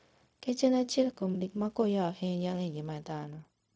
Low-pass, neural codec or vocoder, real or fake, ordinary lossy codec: none; codec, 16 kHz, 0.4 kbps, LongCat-Audio-Codec; fake; none